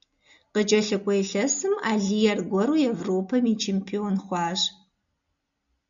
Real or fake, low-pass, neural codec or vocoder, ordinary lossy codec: real; 7.2 kHz; none; MP3, 96 kbps